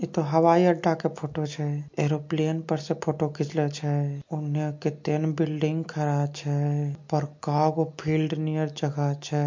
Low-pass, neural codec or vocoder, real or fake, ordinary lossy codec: 7.2 kHz; none; real; MP3, 48 kbps